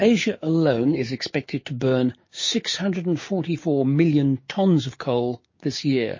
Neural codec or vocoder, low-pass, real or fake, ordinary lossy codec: codec, 16 kHz, 6 kbps, DAC; 7.2 kHz; fake; MP3, 32 kbps